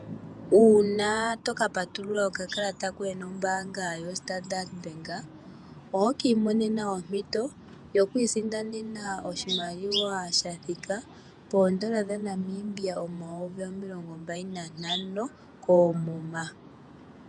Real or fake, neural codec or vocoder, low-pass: fake; vocoder, 48 kHz, 128 mel bands, Vocos; 10.8 kHz